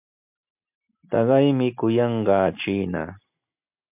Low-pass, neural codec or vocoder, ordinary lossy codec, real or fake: 3.6 kHz; none; MP3, 32 kbps; real